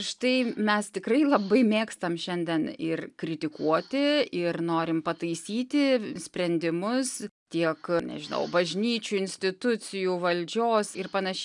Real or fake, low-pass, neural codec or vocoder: real; 10.8 kHz; none